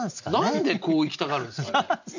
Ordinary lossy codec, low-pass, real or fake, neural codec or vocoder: none; 7.2 kHz; real; none